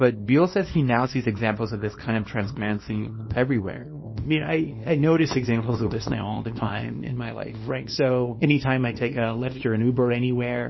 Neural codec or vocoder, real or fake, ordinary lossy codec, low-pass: codec, 24 kHz, 0.9 kbps, WavTokenizer, small release; fake; MP3, 24 kbps; 7.2 kHz